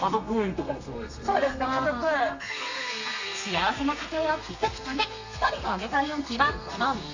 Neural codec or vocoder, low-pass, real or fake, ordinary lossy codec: codec, 32 kHz, 1.9 kbps, SNAC; 7.2 kHz; fake; AAC, 48 kbps